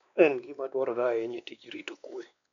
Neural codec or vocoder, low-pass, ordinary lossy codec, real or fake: codec, 16 kHz, 2 kbps, X-Codec, WavLM features, trained on Multilingual LibriSpeech; 7.2 kHz; none; fake